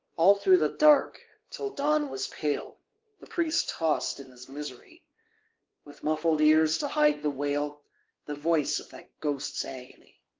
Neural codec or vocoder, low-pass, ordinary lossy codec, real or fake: codec, 16 kHz, 2 kbps, X-Codec, WavLM features, trained on Multilingual LibriSpeech; 7.2 kHz; Opus, 16 kbps; fake